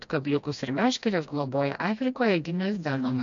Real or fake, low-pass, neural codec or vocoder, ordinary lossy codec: fake; 7.2 kHz; codec, 16 kHz, 1 kbps, FreqCodec, smaller model; MP3, 64 kbps